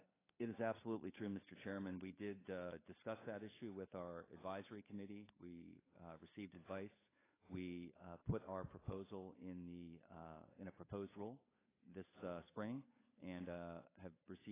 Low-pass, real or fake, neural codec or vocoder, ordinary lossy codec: 3.6 kHz; fake; codec, 16 kHz, 8 kbps, FunCodec, trained on Chinese and English, 25 frames a second; AAC, 16 kbps